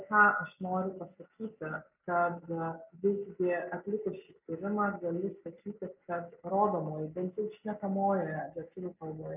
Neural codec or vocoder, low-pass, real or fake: none; 3.6 kHz; real